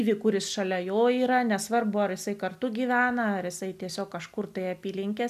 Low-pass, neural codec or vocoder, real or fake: 14.4 kHz; none; real